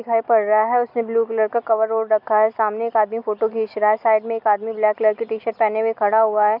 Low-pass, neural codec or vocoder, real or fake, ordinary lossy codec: 5.4 kHz; none; real; none